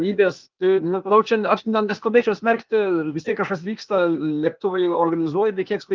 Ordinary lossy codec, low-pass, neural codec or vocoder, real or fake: Opus, 24 kbps; 7.2 kHz; codec, 16 kHz, 0.8 kbps, ZipCodec; fake